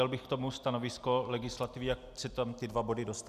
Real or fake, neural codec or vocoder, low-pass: real; none; 14.4 kHz